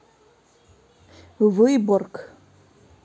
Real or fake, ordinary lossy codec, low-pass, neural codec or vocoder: real; none; none; none